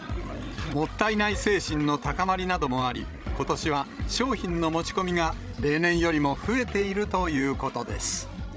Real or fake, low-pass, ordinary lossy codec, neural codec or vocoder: fake; none; none; codec, 16 kHz, 16 kbps, FreqCodec, larger model